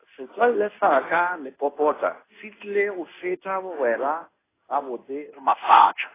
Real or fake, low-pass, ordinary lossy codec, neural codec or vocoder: fake; 3.6 kHz; AAC, 16 kbps; codec, 16 kHz in and 24 kHz out, 1 kbps, XY-Tokenizer